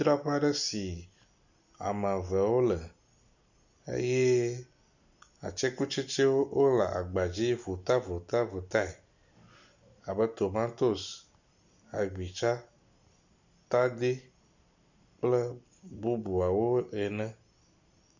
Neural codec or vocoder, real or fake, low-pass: none; real; 7.2 kHz